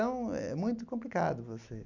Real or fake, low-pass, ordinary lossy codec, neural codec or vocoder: real; 7.2 kHz; none; none